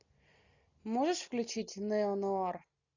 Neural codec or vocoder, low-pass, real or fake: none; 7.2 kHz; real